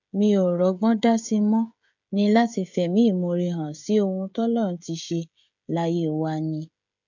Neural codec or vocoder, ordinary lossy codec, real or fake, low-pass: codec, 16 kHz, 16 kbps, FreqCodec, smaller model; none; fake; 7.2 kHz